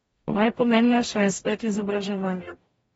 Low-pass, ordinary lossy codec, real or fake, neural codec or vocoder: 19.8 kHz; AAC, 24 kbps; fake; codec, 44.1 kHz, 0.9 kbps, DAC